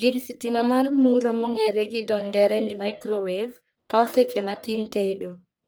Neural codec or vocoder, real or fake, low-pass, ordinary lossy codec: codec, 44.1 kHz, 1.7 kbps, Pupu-Codec; fake; none; none